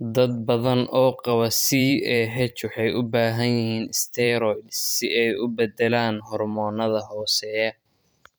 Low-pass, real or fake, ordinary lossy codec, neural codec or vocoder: none; fake; none; vocoder, 44.1 kHz, 128 mel bands every 512 samples, BigVGAN v2